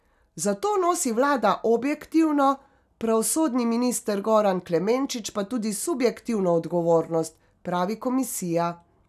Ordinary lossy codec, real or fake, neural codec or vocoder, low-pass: none; real; none; 14.4 kHz